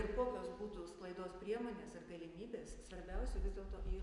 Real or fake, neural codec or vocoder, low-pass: real; none; 10.8 kHz